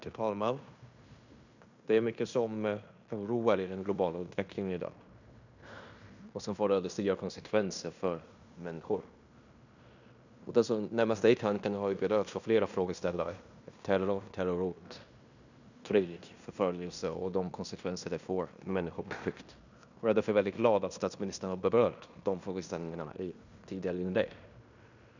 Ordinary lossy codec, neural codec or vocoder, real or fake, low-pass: none; codec, 16 kHz in and 24 kHz out, 0.9 kbps, LongCat-Audio-Codec, fine tuned four codebook decoder; fake; 7.2 kHz